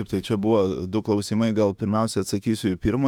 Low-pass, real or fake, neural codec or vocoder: 19.8 kHz; fake; autoencoder, 48 kHz, 32 numbers a frame, DAC-VAE, trained on Japanese speech